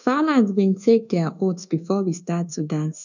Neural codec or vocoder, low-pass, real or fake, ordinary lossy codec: codec, 24 kHz, 1.2 kbps, DualCodec; 7.2 kHz; fake; none